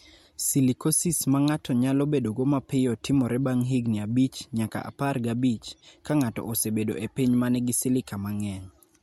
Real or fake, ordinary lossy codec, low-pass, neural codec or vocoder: real; MP3, 64 kbps; 19.8 kHz; none